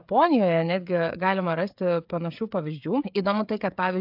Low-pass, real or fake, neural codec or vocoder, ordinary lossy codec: 5.4 kHz; fake; codec, 16 kHz, 16 kbps, FreqCodec, smaller model; AAC, 48 kbps